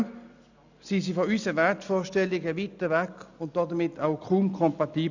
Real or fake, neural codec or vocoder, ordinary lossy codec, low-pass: real; none; none; 7.2 kHz